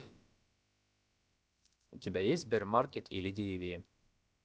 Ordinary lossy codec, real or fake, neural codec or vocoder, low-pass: none; fake; codec, 16 kHz, about 1 kbps, DyCAST, with the encoder's durations; none